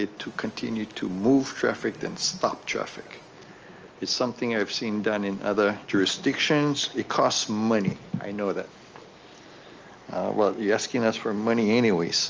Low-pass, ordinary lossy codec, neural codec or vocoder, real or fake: 7.2 kHz; Opus, 24 kbps; none; real